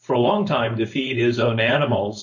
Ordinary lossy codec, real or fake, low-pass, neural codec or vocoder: MP3, 32 kbps; fake; 7.2 kHz; codec, 16 kHz, 4.8 kbps, FACodec